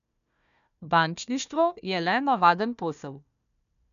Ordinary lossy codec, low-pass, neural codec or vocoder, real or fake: none; 7.2 kHz; codec, 16 kHz, 1 kbps, FunCodec, trained on Chinese and English, 50 frames a second; fake